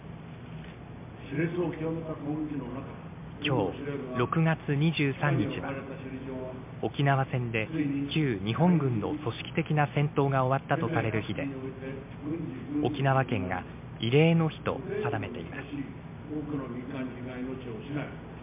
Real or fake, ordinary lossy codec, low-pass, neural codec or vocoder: real; MP3, 32 kbps; 3.6 kHz; none